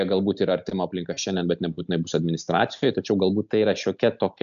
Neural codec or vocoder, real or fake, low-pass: none; real; 7.2 kHz